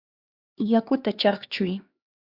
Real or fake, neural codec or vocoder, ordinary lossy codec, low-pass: fake; codec, 16 kHz, 2 kbps, X-Codec, HuBERT features, trained on LibriSpeech; Opus, 64 kbps; 5.4 kHz